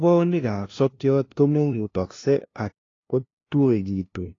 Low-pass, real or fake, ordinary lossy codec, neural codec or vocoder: 7.2 kHz; fake; AAC, 32 kbps; codec, 16 kHz, 0.5 kbps, FunCodec, trained on LibriTTS, 25 frames a second